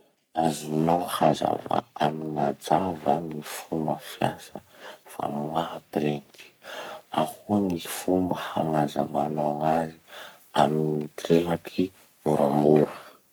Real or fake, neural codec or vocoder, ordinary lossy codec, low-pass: fake; codec, 44.1 kHz, 3.4 kbps, Pupu-Codec; none; none